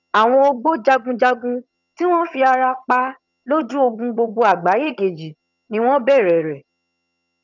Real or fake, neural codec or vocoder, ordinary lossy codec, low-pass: fake; vocoder, 22.05 kHz, 80 mel bands, HiFi-GAN; none; 7.2 kHz